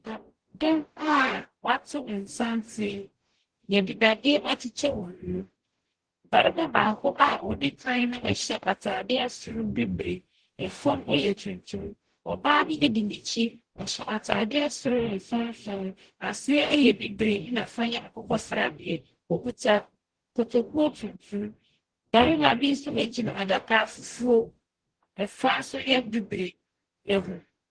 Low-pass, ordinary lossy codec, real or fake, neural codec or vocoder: 9.9 kHz; Opus, 16 kbps; fake; codec, 44.1 kHz, 0.9 kbps, DAC